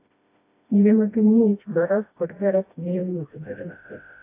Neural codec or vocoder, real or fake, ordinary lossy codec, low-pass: codec, 16 kHz, 1 kbps, FreqCodec, smaller model; fake; AAC, 24 kbps; 3.6 kHz